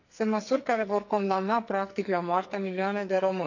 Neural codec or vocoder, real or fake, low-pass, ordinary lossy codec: codec, 44.1 kHz, 2.6 kbps, SNAC; fake; 7.2 kHz; none